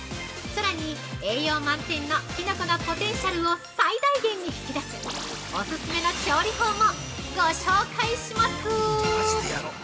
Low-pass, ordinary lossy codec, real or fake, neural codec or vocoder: none; none; real; none